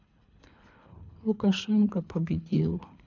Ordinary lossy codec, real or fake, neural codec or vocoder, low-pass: none; fake; codec, 24 kHz, 3 kbps, HILCodec; 7.2 kHz